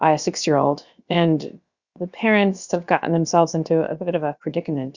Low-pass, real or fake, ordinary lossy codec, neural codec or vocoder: 7.2 kHz; fake; Opus, 64 kbps; codec, 16 kHz, about 1 kbps, DyCAST, with the encoder's durations